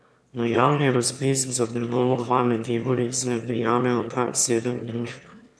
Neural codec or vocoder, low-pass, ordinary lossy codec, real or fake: autoencoder, 22.05 kHz, a latent of 192 numbers a frame, VITS, trained on one speaker; none; none; fake